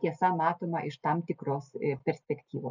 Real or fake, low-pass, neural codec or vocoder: real; 7.2 kHz; none